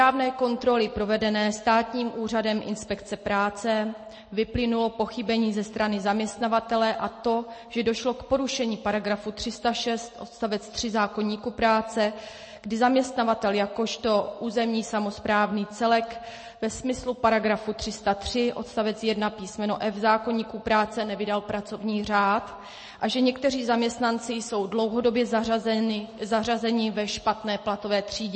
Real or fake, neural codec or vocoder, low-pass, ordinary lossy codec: real; none; 9.9 kHz; MP3, 32 kbps